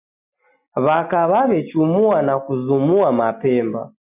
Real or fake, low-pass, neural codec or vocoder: real; 3.6 kHz; none